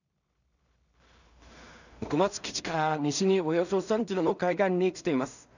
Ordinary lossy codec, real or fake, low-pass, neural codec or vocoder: none; fake; 7.2 kHz; codec, 16 kHz in and 24 kHz out, 0.4 kbps, LongCat-Audio-Codec, two codebook decoder